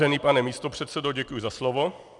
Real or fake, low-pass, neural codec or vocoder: real; 10.8 kHz; none